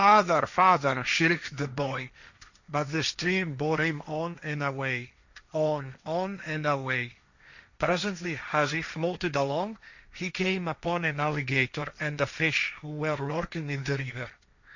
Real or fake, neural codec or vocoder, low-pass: fake; codec, 16 kHz, 1.1 kbps, Voila-Tokenizer; 7.2 kHz